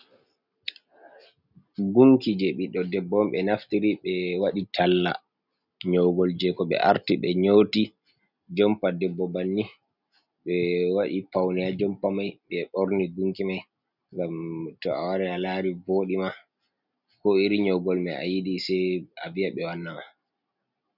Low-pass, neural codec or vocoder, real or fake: 5.4 kHz; none; real